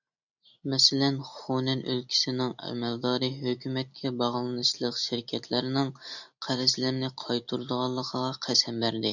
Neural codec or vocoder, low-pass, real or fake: none; 7.2 kHz; real